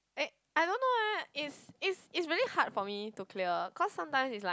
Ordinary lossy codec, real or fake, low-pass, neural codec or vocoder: none; real; none; none